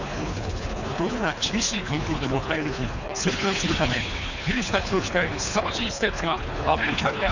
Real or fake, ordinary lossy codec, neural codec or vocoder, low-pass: fake; none; codec, 24 kHz, 3 kbps, HILCodec; 7.2 kHz